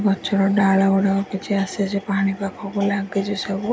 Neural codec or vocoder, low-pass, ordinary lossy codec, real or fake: none; none; none; real